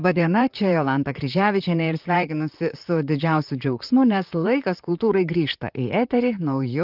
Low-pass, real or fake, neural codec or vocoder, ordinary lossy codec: 5.4 kHz; fake; vocoder, 44.1 kHz, 128 mel bands, Pupu-Vocoder; Opus, 32 kbps